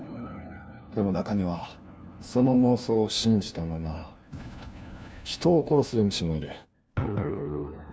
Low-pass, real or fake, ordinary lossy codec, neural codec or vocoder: none; fake; none; codec, 16 kHz, 1 kbps, FunCodec, trained on LibriTTS, 50 frames a second